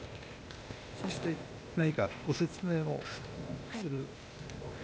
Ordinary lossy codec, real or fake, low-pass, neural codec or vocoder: none; fake; none; codec, 16 kHz, 0.8 kbps, ZipCodec